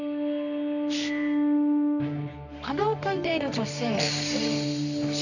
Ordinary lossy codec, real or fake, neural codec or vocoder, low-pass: none; fake; codec, 24 kHz, 0.9 kbps, WavTokenizer, medium music audio release; 7.2 kHz